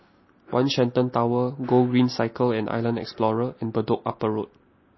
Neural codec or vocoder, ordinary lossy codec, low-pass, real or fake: none; MP3, 24 kbps; 7.2 kHz; real